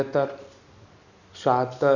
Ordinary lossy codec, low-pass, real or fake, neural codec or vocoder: none; 7.2 kHz; real; none